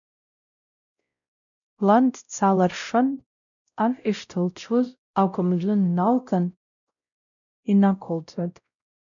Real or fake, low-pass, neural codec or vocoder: fake; 7.2 kHz; codec, 16 kHz, 0.5 kbps, X-Codec, WavLM features, trained on Multilingual LibriSpeech